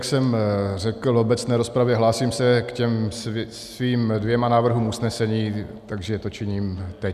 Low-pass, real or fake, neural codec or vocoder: 14.4 kHz; real; none